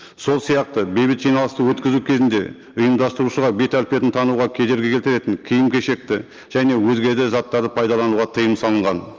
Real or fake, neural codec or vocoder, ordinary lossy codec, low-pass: real; none; Opus, 24 kbps; 7.2 kHz